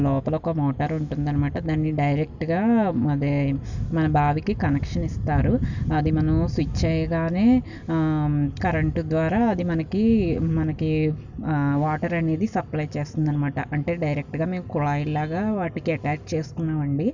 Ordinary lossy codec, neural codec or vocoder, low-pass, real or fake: none; none; 7.2 kHz; real